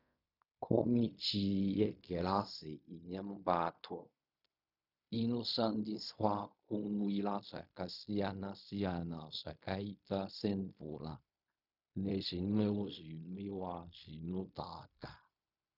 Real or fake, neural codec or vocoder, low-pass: fake; codec, 16 kHz in and 24 kHz out, 0.4 kbps, LongCat-Audio-Codec, fine tuned four codebook decoder; 5.4 kHz